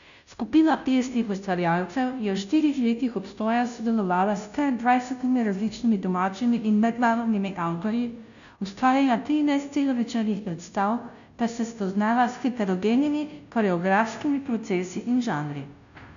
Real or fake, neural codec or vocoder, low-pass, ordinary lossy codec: fake; codec, 16 kHz, 0.5 kbps, FunCodec, trained on Chinese and English, 25 frames a second; 7.2 kHz; none